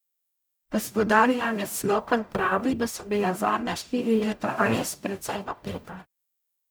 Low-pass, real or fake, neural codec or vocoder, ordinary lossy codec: none; fake; codec, 44.1 kHz, 0.9 kbps, DAC; none